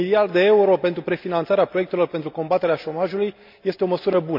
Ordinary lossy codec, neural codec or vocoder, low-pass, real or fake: MP3, 48 kbps; none; 5.4 kHz; real